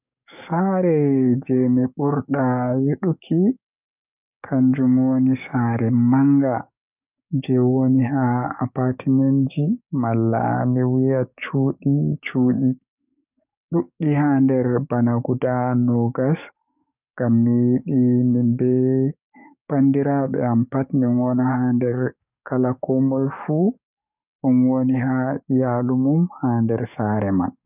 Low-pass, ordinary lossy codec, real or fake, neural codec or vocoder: 3.6 kHz; none; fake; codec, 44.1 kHz, 7.8 kbps, DAC